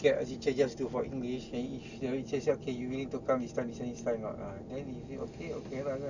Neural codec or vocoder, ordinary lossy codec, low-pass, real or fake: none; none; 7.2 kHz; real